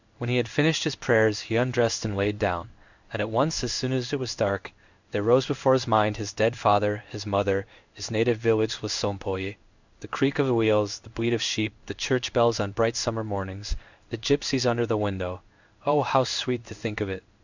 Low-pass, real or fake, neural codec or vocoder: 7.2 kHz; fake; codec, 16 kHz in and 24 kHz out, 1 kbps, XY-Tokenizer